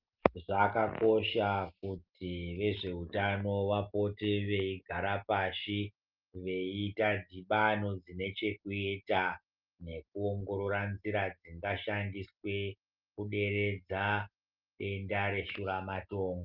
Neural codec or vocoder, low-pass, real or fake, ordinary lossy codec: none; 5.4 kHz; real; Opus, 32 kbps